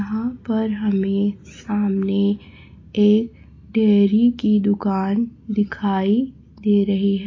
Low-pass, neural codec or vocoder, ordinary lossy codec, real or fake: 7.2 kHz; none; AAC, 32 kbps; real